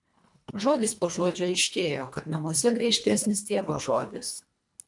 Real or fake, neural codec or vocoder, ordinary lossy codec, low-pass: fake; codec, 24 kHz, 1.5 kbps, HILCodec; MP3, 96 kbps; 10.8 kHz